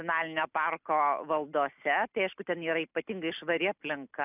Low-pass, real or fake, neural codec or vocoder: 3.6 kHz; real; none